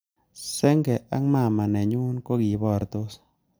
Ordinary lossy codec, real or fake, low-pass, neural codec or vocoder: none; real; none; none